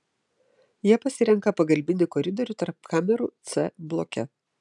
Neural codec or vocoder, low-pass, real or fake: none; 10.8 kHz; real